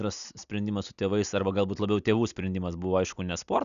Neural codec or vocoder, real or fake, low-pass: none; real; 7.2 kHz